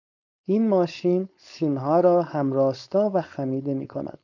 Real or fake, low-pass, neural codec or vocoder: fake; 7.2 kHz; codec, 16 kHz, 4.8 kbps, FACodec